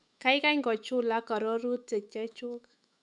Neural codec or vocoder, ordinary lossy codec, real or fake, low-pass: autoencoder, 48 kHz, 128 numbers a frame, DAC-VAE, trained on Japanese speech; none; fake; 10.8 kHz